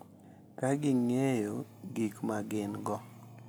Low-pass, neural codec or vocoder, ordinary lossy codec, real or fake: none; none; none; real